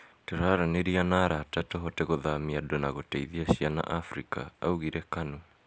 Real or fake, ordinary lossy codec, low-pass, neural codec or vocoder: real; none; none; none